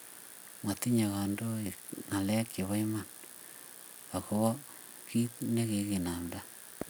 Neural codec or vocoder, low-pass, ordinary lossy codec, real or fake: none; none; none; real